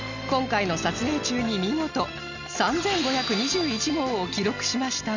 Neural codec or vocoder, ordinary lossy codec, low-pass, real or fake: none; none; 7.2 kHz; real